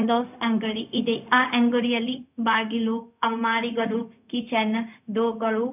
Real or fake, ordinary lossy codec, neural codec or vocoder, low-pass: fake; none; codec, 16 kHz, 0.4 kbps, LongCat-Audio-Codec; 3.6 kHz